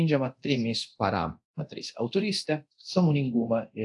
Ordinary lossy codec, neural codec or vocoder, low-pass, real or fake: AAC, 64 kbps; codec, 24 kHz, 0.9 kbps, DualCodec; 10.8 kHz; fake